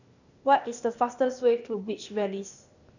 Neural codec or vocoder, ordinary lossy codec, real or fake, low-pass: codec, 16 kHz, 0.8 kbps, ZipCodec; none; fake; 7.2 kHz